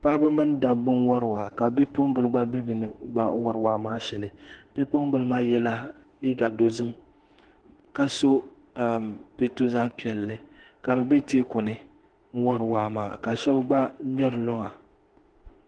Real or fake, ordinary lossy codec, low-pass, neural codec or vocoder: fake; Opus, 16 kbps; 9.9 kHz; autoencoder, 48 kHz, 32 numbers a frame, DAC-VAE, trained on Japanese speech